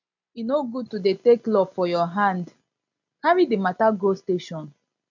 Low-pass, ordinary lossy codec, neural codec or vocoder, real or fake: 7.2 kHz; none; none; real